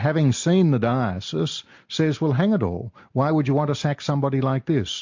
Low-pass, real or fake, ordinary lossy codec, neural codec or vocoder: 7.2 kHz; real; MP3, 48 kbps; none